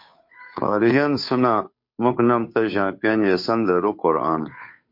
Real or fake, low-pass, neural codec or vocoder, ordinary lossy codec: fake; 5.4 kHz; codec, 16 kHz, 2 kbps, FunCodec, trained on Chinese and English, 25 frames a second; MP3, 32 kbps